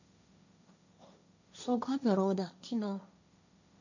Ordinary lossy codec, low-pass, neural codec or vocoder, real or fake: none; 7.2 kHz; codec, 16 kHz, 1.1 kbps, Voila-Tokenizer; fake